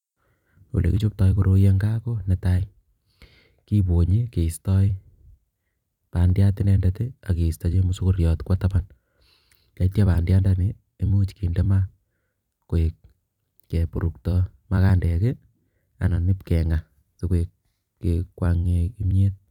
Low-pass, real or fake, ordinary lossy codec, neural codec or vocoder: 19.8 kHz; fake; none; vocoder, 44.1 kHz, 128 mel bands every 256 samples, BigVGAN v2